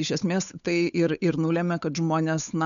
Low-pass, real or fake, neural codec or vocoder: 7.2 kHz; fake; codec, 16 kHz, 8 kbps, FunCodec, trained on Chinese and English, 25 frames a second